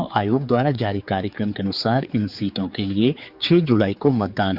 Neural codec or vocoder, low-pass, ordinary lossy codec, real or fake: codec, 16 kHz, 4 kbps, X-Codec, HuBERT features, trained on general audio; 5.4 kHz; Opus, 64 kbps; fake